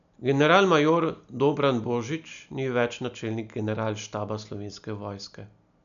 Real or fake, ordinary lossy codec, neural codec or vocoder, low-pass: real; none; none; 7.2 kHz